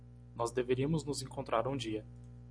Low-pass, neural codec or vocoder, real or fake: 9.9 kHz; none; real